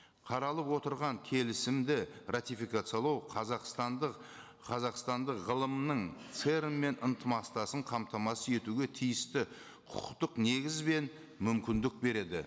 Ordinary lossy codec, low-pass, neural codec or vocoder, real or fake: none; none; none; real